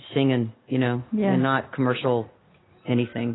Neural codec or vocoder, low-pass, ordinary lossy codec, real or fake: none; 7.2 kHz; AAC, 16 kbps; real